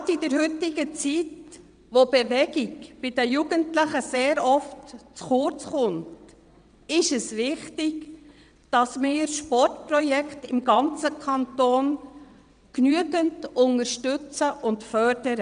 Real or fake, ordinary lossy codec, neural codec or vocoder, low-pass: fake; none; vocoder, 22.05 kHz, 80 mel bands, WaveNeXt; 9.9 kHz